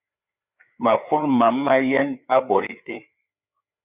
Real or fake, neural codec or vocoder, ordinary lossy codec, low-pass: fake; codec, 16 kHz, 4 kbps, FreqCodec, larger model; Opus, 64 kbps; 3.6 kHz